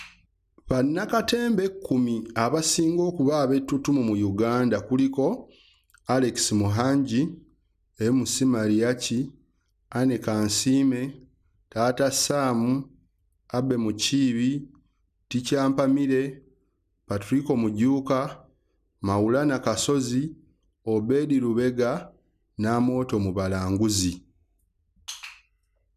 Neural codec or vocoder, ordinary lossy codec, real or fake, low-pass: none; none; real; 14.4 kHz